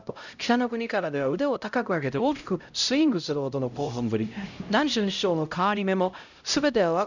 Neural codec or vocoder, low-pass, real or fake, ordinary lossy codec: codec, 16 kHz, 0.5 kbps, X-Codec, HuBERT features, trained on LibriSpeech; 7.2 kHz; fake; none